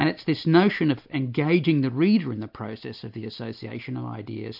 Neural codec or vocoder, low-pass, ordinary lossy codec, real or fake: none; 5.4 kHz; AAC, 48 kbps; real